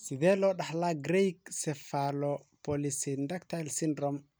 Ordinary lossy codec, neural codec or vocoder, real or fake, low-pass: none; none; real; none